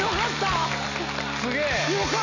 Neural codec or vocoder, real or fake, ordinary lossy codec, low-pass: none; real; none; 7.2 kHz